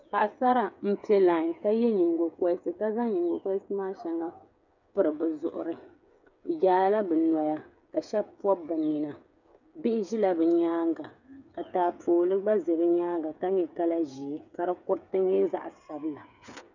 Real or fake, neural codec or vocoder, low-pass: fake; codec, 16 kHz, 8 kbps, FreqCodec, smaller model; 7.2 kHz